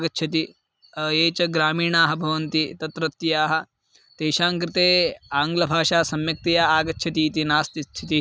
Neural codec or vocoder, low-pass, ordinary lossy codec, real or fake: none; none; none; real